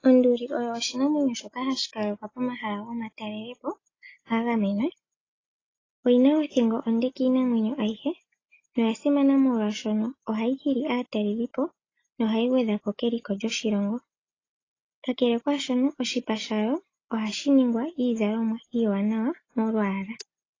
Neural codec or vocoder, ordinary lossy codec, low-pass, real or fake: none; AAC, 32 kbps; 7.2 kHz; real